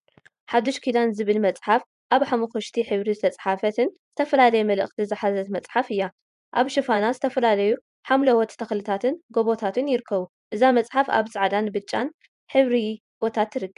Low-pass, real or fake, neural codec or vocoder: 10.8 kHz; fake; vocoder, 24 kHz, 100 mel bands, Vocos